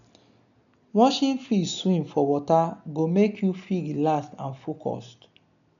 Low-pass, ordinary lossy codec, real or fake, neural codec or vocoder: 7.2 kHz; none; real; none